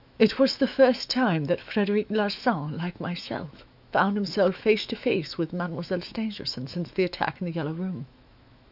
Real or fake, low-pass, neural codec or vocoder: fake; 5.4 kHz; autoencoder, 48 kHz, 128 numbers a frame, DAC-VAE, trained on Japanese speech